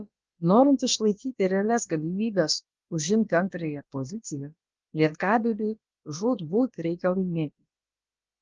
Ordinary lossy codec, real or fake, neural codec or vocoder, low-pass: Opus, 24 kbps; fake; codec, 16 kHz, about 1 kbps, DyCAST, with the encoder's durations; 7.2 kHz